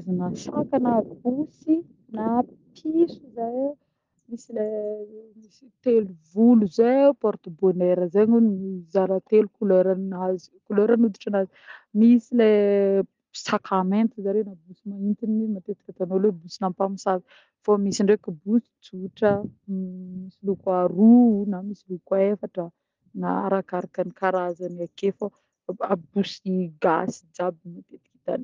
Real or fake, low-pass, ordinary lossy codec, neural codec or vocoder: real; 7.2 kHz; Opus, 32 kbps; none